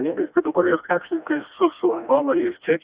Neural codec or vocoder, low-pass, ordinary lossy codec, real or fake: codec, 16 kHz, 1 kbps, FreqCodec, smaller model; 3.6 kHz; Opus, 64 kbps; fake